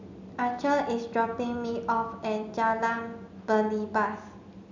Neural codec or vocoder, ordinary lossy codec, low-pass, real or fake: none; MP3, 64 kbps; 7.2 kHz; real